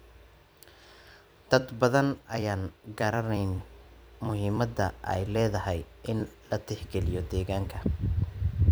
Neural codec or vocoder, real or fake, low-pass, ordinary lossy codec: vocoder, 44.1 kHz, 128 mel bands every 256 samples, BigVGAN v2; fake; none; none